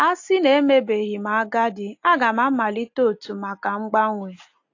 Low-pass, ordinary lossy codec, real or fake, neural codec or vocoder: 7.2 kHz; AAC, 48 kbps; real; none